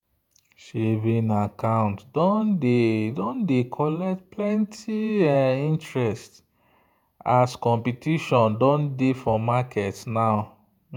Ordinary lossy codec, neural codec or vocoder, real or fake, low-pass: none; vocoder, 48 kHz, 128 mel bands, Vocos; fake; 19.8 kHz